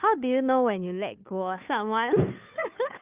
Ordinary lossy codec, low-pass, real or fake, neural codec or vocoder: Opus, 24 kbps; 3.6 kHz; fake; codec, 16 kHz, 2 kbps, FunCodec, trained on Chinese and English, 25 frames a second